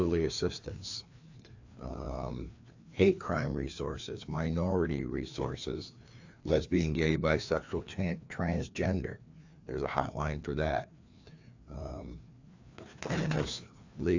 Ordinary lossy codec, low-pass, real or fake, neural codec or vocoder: AAC, 48 kbps; 7.2 kHz; fake; codec, 16 kHz, 2 kbps, FreqCodec, larger model